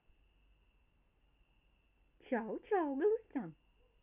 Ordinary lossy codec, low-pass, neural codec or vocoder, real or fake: none; 3.6 kHz; none; real